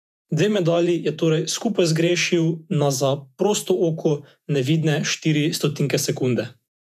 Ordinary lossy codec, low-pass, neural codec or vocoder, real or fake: none; 14.4 kHz; vocoder, 48 kHz, 128 mel bands, Vocos; fake